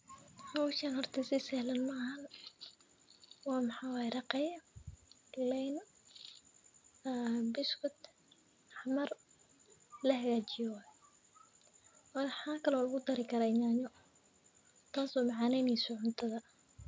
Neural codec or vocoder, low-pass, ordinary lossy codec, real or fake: none; none; none; real